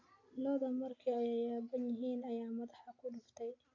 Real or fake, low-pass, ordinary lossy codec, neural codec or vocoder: real; 7.2 kHz; AAC, 32 kbps; none